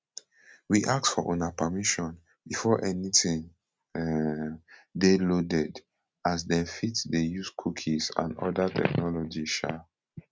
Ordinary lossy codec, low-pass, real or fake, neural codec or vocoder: none; none; real; none